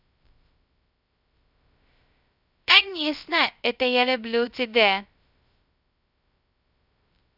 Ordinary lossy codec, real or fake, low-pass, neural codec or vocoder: none; fake; 5.4 kHz; codec, 16 kHz, 0.2 kbps, FocalCodec